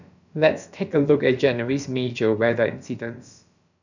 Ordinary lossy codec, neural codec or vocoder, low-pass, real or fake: none; codec, 16 kHz, about 1 kbps, DyCAST, with the encoder's durations; 7.2 kHz; fake